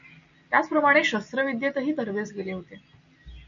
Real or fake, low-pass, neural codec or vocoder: real; 7.2 kHz; none